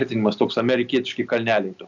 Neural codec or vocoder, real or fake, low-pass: none; real; 7.2 kHz